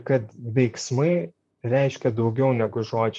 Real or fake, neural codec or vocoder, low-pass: fake; vocoder, 44.1 kHz, 128 mel bands, Pupu-Vocoder; 10.8 kHz